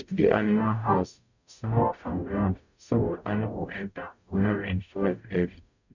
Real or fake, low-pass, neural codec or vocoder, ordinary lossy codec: fake; 7.2 kHz; codec, 44.1 kHz, 0.9 kbps, DAC; none